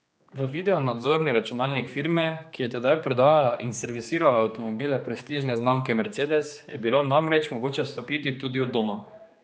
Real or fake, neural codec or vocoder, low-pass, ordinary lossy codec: fake; codec, 16 kHz, 2 kbps, X-Codec, HuBERT features, trained on general audio; none; none